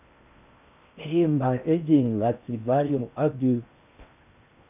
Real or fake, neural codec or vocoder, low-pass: fake; codec, 16 kHz in and 24 kHz out, 0.6 kbps, FocalCodec, streaming, 4096 codes; 3.6 kHz